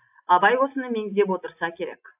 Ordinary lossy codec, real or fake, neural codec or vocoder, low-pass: AAC, 32 kbps; real; none; 3.6 kHz